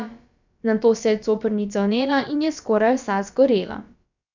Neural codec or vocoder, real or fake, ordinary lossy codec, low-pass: codec, 16 kHz, about 1 kbps, DyCAST, with the encoder's durations; fake; none; 7.2 kHz